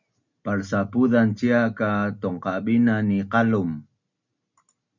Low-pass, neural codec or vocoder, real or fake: 7.2 kHz; none; real